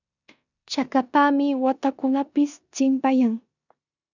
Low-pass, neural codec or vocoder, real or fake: 7.2 kHz; codec, 16 kHz in and 24 kHz out, 0.9 kbps, LongCat-Audio-Codec, four codebook decoder; fake